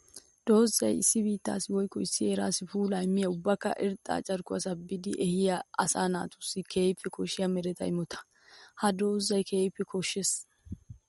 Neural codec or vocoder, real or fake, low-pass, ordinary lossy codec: none; real; 19.8 kHz; MP3, 48 kbps